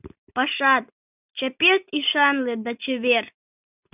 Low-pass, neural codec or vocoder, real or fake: 3.6 kHz; none; real